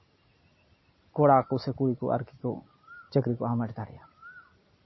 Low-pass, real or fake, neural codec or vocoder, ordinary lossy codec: 7.2 kHz; real; none; MP3, 24 kbps